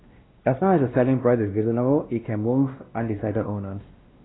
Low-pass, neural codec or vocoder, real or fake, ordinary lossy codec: 7.2 kHz; codec, 16 kHz, 1 kbps, X-Codec, WavLM features, trained on Multilingual LibriSpeech; fake; AAC, 16 kbps